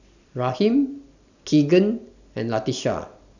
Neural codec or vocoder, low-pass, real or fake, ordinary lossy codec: none; 7.2 kHz; real; none